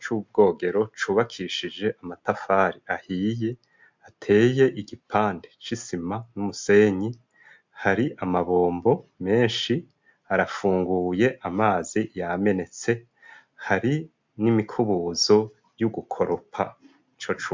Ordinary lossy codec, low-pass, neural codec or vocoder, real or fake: MP3, 64 kbps; 7.2 kHz; none; real